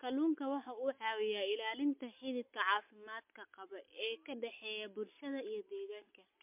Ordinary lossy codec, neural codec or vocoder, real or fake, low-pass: MP3, 32 kbps; none; real; 3.6 kHz